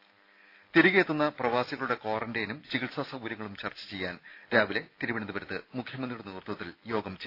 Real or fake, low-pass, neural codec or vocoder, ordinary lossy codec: real; 5.4 kHz; none; AAC, 32 kbps